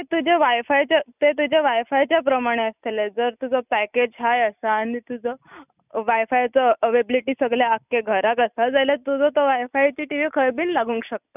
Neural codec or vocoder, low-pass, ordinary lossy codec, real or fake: none; 3.6 kHz; none; real